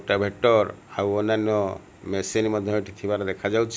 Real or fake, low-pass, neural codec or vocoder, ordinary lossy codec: real; none; none; none